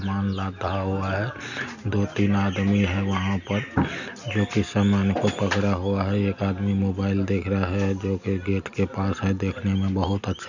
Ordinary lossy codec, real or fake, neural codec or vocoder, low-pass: none; real; none; 7.2 kHz